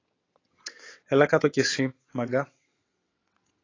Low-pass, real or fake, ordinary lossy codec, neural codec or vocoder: 7.2 kHz; fake; AAC, 32 kbps; vocoder, 22.05 kHz, 80 mel bands, WaveNeXt